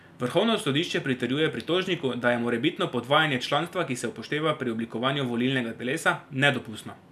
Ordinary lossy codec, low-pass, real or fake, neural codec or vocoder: none; 14.4 kHz; real; none